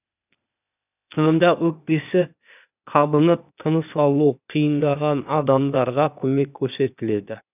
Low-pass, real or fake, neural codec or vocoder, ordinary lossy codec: 3.6 kHz; fake; codec, 16 kHz, 0.8 kbps, ZipCodec; none